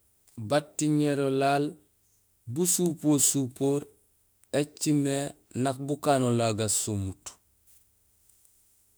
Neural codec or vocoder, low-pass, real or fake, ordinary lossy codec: autoencoder, 48 kHz, 32 numbers a frame, DAC-VAE, trained on Japanese speech; none; fake; none